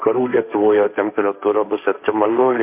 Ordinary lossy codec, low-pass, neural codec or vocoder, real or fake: MP3, 32 kbps; 3.6 kHz; codec, 16 kHz, 1.1 kbps, Voila-Tokenizer; fake